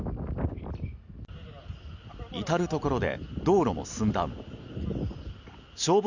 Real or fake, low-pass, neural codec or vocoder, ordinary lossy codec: real; 7.2 kHz; none; none